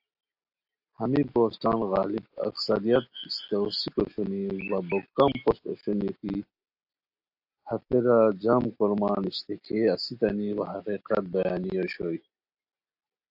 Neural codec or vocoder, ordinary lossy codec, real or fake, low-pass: none; AAC, 48 kbps; real; 5.4 kHz